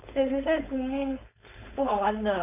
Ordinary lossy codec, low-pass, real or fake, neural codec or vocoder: none; 3.6 kHz; fake; codec, 16 kHz, 4.8 kbps, FACodec